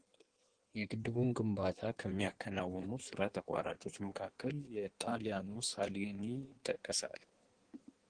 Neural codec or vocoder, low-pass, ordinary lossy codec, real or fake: codec, 16 kHz in and 24 kHz out, 1.1 kbps, FireRedTTS-2 codec; 9.9 kHz; Opus, 16 kbps; fake